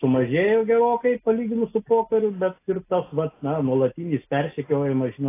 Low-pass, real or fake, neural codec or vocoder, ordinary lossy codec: 3.6 kHz; real; none; AAC, 24 kbps